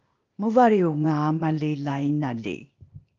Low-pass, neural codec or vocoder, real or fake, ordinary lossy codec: 7.2 kHz; codec, 16 kHz, 0.8 kbps, ZipCodec; fake; Opus, 24 kbps